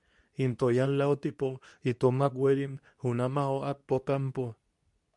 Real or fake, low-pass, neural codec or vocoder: fake; 10.8 kHz; codec, 24 kHz, 0.9 kbps, WavTokenizer, medium speech release version 2